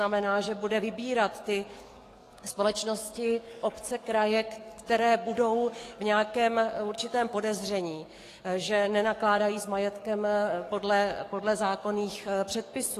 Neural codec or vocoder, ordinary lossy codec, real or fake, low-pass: codec, 44.1 kHz, 7.8 kbps, DAC; AAC, 48 kbps; fake; 14.4 kHz